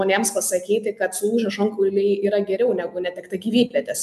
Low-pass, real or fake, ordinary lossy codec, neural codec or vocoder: 14.4 kHz; real; MP3, 96 kbps; none